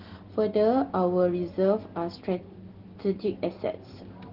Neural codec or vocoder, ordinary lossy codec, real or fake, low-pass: none; Opus, 16 kbps; real; 5.4 kHz